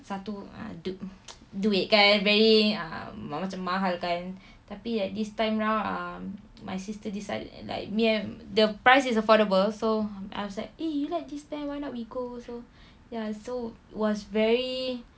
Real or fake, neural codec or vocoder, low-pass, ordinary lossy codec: real; none; none; none